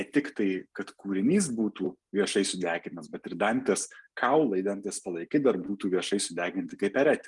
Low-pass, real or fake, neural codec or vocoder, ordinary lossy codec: 10.8 kHz; real; none; Opus, 32 kbps